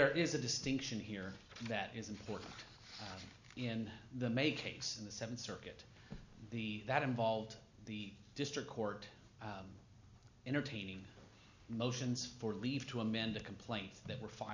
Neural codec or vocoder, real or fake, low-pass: none; real; 7.2 kHz